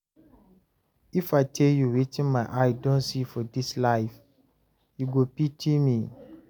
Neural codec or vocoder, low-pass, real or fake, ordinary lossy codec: none; none; real; none